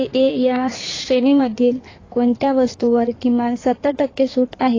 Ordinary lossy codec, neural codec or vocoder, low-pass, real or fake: AAC, 32 kbps; codec, 16 kHz in and 24 kHz out, 1.1 kbps, FireRedTTS-2 codec; 7.2 kHz; fake